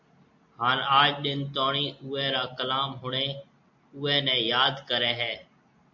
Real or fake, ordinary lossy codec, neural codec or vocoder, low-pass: real; MP3, 48 kbps; none; 7.2 kHz